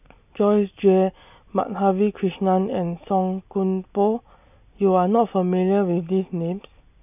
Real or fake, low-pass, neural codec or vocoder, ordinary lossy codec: real; 3.6 kHz; none; none